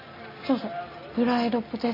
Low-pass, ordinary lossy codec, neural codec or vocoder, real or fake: 5.4 kHz; none; none; real